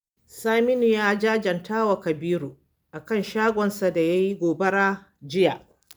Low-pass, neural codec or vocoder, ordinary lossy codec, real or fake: none; none; none; real